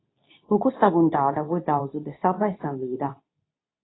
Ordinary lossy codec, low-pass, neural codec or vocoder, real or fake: AAC, 16 kbps; 7.2 kHz; codec, 24 kHz, 0.9 kbps, WavTokenizer, medium speech release version 2; fake